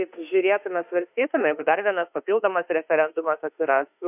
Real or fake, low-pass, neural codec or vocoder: fake; 3.6 kHz; autoencoder, 48 kHz, 32 numbers a frame, DAC-VAE, trained on Japanese speech